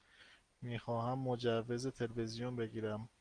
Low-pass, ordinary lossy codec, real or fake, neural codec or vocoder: 9.9 kHz; Opus, 24 kbps; real; none